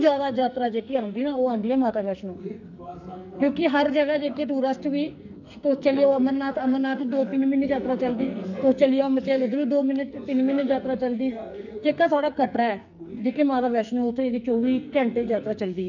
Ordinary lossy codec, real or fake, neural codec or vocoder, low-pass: none; fake; codec, 44.1 kHz, 2.6 kbps, SNAC; 7.2 kHz